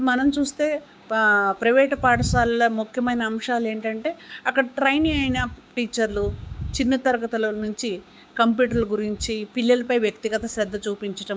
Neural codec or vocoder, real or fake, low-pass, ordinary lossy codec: codec, 16 kHz, 6 kbps, DAC; fake; none; none